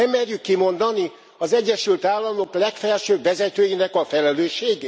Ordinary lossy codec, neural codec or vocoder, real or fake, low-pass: none; none; real; none